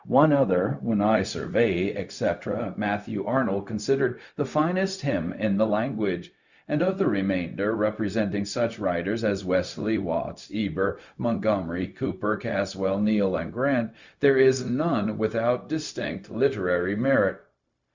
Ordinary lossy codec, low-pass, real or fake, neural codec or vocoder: Opus, 64 kbps; 7.2 kHz; fake; codec, 16 kHz, 0.4 kbps, LongCat-Audio-Codec